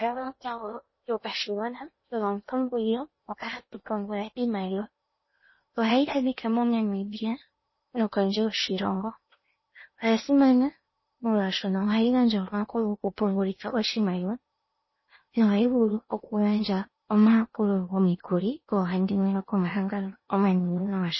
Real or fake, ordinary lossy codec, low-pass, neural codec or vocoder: fake; MP3, 24 kbps; 7.2 kHz; codec, 16 kHz in and 24 kHz out, 0.8 kbps, FocalCodec, streaming, 65536 codes